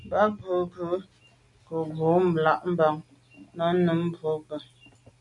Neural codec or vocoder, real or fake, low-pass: none; real; 10.8 kHz